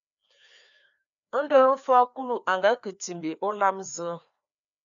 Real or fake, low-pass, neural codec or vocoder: fake; 7.2 kHz; codec, 16 kHz, 2 kbps, FreqCodec, larger model